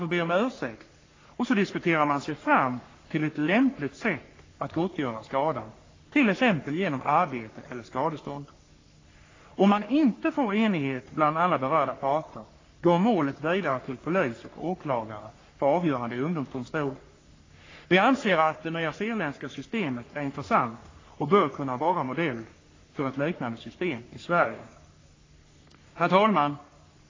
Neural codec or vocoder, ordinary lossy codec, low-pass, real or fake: codec, 44.1 kHz, 3.4 kbps, Pupu-Codec; AAC, 32 kbps; 7.2 kHz; fake